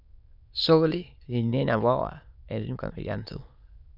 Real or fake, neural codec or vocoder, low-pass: fake; autoencoder, 22.05 kHz, a latent of 192 numbers a frame, VITS, trained on many speakers; 5.4 kHz